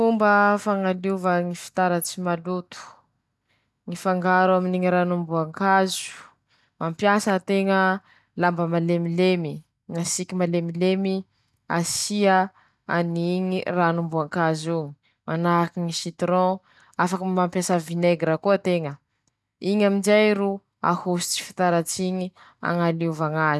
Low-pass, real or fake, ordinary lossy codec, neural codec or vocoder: none; real; none; none